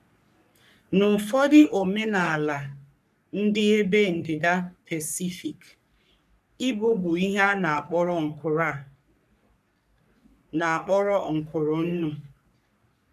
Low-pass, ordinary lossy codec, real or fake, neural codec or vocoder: 14.4 kHz; none; fake; codec, 44.1 kHz, 3.4 kbps, Pupu-Codec